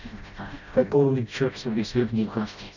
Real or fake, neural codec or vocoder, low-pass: fake; codec, 16 kHz, 0.5 kbps, FreqCodec, smaller model; 7.2 kHz